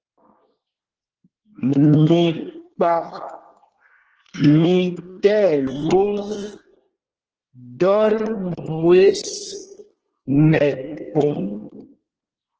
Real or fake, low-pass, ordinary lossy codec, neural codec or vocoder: fake; 7.2 kHz; Opus, 16 kbps; codec, 24 kHz, 1 kbps, SNAC